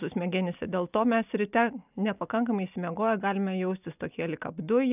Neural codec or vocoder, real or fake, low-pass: none; real; 3.6 kHz